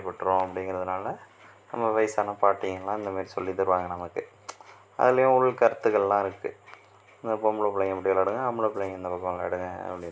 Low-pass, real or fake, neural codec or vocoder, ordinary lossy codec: none; real; none; none